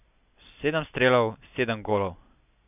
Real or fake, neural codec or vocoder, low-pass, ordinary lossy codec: real; none; 3.6 kHz; none